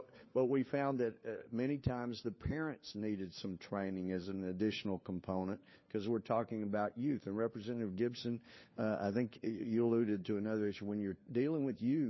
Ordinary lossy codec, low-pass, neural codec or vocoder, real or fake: MP3, 24 kbps; 7.2 kHz; codec, 16 kHz, 4 kbps, FunCodec, trained on LibriTTS, 50 frames a second; fake